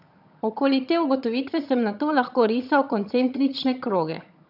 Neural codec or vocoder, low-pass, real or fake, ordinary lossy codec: vocoder, 22.05 kHz, 80 mel bands, HiFi-GAN; 5.4 kHz; fake; none